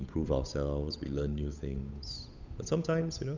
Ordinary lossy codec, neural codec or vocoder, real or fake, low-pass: none; codec, 16 kHz, 8 kbps, FunCodec, trained on Chinese and English, 25 frames a second; fake; 7.2 kHz